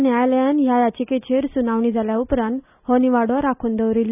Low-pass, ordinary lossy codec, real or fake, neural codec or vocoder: 3.6 kHz; none; real; none